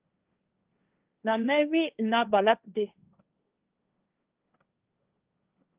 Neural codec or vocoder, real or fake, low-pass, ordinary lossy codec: codec, 16 kHz, 1.1 kbps, Voila-Tokenizer; fake; 3.6 kHz; Opus, 24 kbps